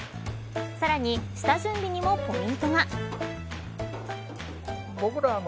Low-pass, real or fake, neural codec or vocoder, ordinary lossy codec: none; real; none; none